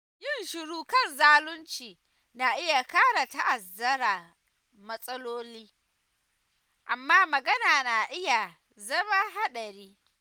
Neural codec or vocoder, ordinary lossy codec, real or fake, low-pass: none; none; real; none